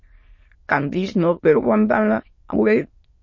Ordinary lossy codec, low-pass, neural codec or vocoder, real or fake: MP3, 32 kbps; 7.2 kHz; autoencoder, 22.05 kHz, a latent of 192 numbers a frame, VITS, trained on many speakers; fake